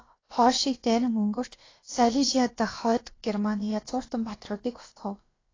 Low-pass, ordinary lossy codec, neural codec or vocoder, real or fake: 7.2 kHz; AAC, 32 kbps; codec, 16 kHz, about 1 kbps, DyCAST, with the encoder's durations; fake